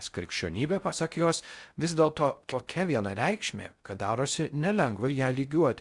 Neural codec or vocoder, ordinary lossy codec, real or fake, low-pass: codec, 16 kHz in and 24 kHz out, 0.6 kbps, FocalCodec, streaming, 4096 codes; Opus, 64 kbps; fake; 10.8 kHz